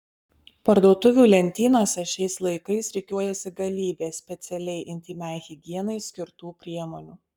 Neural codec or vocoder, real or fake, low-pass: codec, 44.1 kHz, 7.8 kbps, Pupu-Codec; fake; 19.8 kHz